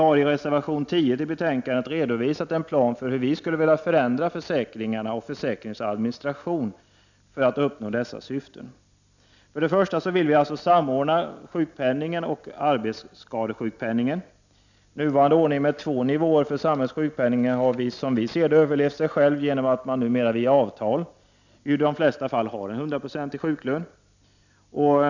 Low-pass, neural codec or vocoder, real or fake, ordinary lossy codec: 7.2 kHz; none; real; none